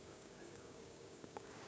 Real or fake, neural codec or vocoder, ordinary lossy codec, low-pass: fake; codec, 16 kHz, 6 kbps, DAC; none; none